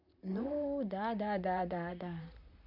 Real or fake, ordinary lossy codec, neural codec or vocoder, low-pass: fake; none; vocoder, 44.1 kHz, 128 mel bands, Pupu-Vocoder; 5.4 kHz